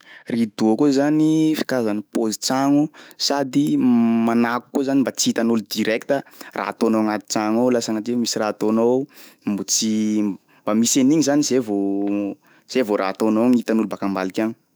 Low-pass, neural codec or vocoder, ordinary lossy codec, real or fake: none; autoencoder, 48 kHz, 128 numbers a frame, DAC-VAE, trained on Japanese speech; none; fake